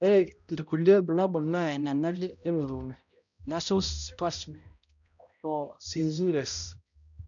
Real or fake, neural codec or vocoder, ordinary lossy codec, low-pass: fake; codec, 16 kHz, 0.5 kbps, X-Codec, HuBERT features, trained on balanced general audio; none; 7.2 kHz